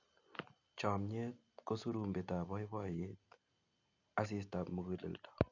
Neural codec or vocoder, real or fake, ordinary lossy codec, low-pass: none; real; none; 7.2 kHz